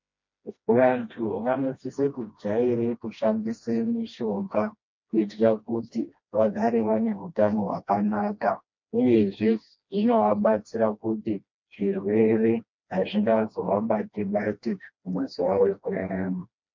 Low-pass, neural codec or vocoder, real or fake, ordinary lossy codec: 7.2 kHz; codec, 16 kHz, 1 kbps, FreqCodec, smaller model; fake; MP3, 48 kbps